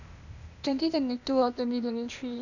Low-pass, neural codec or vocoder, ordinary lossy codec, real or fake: 7.2 kHz; codec, 16 kHz, 0.8 kbps, ZipCodec; none; fake